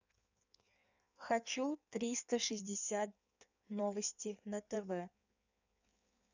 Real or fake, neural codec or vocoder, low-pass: fake; codec, 16 kHz in and 24 kHz out, 1.1 kbps, FireRedTTS-2 codec; 7.2 kHz